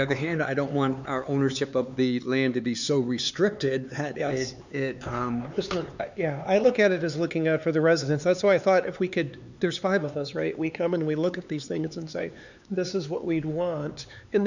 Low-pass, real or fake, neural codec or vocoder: 7.2 kHz; fake; codec, 16 kHz, 4 kbps, X-Codec, HuBERT features, trained on LibriSpeech